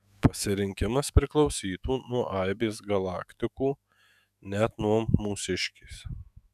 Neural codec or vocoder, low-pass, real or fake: autoencoder, 48 kHz, 128 numbers a frame, DAC-VAE, trained on Japanese speech; 14.4 kHz; fake